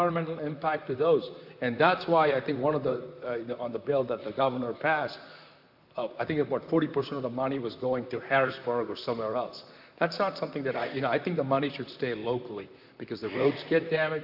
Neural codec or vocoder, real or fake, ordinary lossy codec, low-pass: vocoder, 44.1 kHz, 128 mel bands, Pupu-Vocoder; fake; AAC, 48 kbps; 5.4 kHz